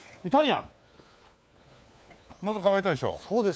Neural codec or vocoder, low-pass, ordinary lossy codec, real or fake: codec, 16 kHz, 4 kbps, FunCodec, trained on LibriTTS, 50 frames a second; none; none; fake